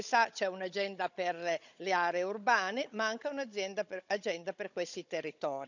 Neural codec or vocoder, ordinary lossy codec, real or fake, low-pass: codec, 16 kHz, 16 kbps, FunCodec, trained on Chinese and English, 50 frames a second; none; fake; 7.2 kHz